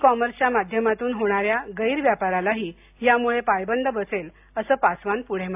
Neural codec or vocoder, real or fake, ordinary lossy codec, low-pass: none; real; AAC, 32 kbps; 3.6 kHz